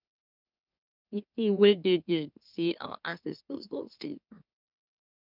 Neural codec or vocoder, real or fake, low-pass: autoencoder, 44.1 kHz, a latent of 192 numbers a frame, MeloTTS; fake; 5.4 kHz